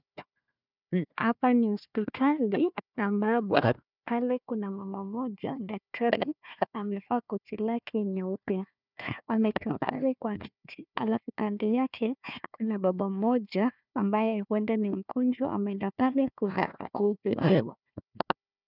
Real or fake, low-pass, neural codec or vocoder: fake; 5.4 kHz; codec, 16 kHz, 1 kbps, FunCodec, trained on Chinese and English, 50 frames a second